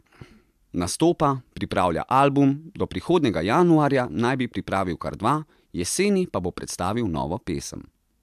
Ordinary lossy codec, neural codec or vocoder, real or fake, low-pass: MP3, 96 kbps; none; real; 14.4 kHz